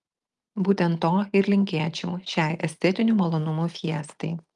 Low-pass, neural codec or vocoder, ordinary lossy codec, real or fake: 10.8 kHz; none; Opus, 32 kbps; real